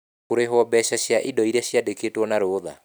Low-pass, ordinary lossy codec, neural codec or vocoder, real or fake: none; none; none; real